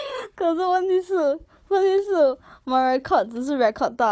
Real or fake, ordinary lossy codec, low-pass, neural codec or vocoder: fake; none; none; codec, 16 kHz, 4 kbps, FunCodec, trained on Chinese and English, 50 frames a second